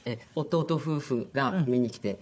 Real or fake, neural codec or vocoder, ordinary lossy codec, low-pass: fake; codec, 16 kHz, 4 kbps, FunCodec, trained on Chinese and English, 50 frames a second; none; none